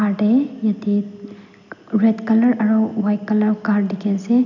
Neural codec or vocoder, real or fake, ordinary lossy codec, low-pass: none; real; none; 7.2 kHz